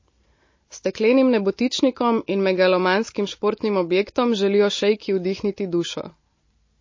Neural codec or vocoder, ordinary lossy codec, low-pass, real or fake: none; MP3, 32 kbps; 7.2 kHz; real